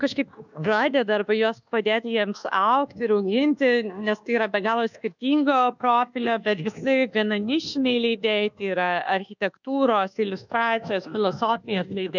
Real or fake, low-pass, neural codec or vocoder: fake; 7.2 kHz; codec, 24 kHz, 1.2 kbps, DualCodec